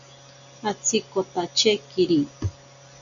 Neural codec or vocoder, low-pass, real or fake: none; 7.2 kHz; real